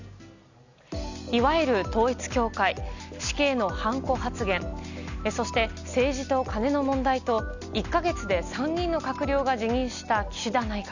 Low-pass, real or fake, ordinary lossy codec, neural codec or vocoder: 7.2 kHz; real; none; none